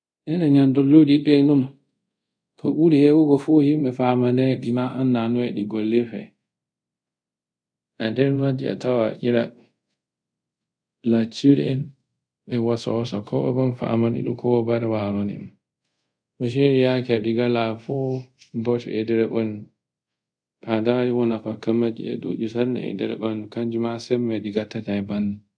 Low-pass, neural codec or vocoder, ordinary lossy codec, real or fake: 9.9 kHz; codec, 24 kHz, 0.5 kbps, DualCodec; none; fake